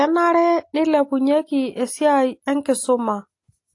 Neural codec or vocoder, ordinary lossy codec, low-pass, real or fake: none; AAC, 48 kbps; 10.8 kHz; real